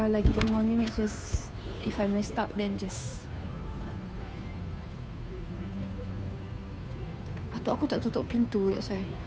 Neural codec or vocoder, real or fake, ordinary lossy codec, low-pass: codec, 16 kHz, 2 kbps, FunCodec, trained on Chinese and English, 25 frames a second; fake; none; none